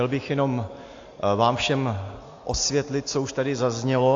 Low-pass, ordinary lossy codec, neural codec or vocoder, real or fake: 7.2 kHz; AAC, 48 kbps; none; real